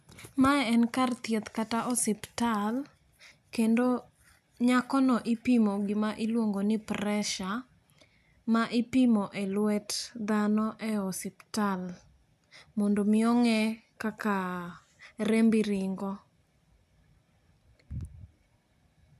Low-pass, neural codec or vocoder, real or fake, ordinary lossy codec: 14.4 kHz; none; real; none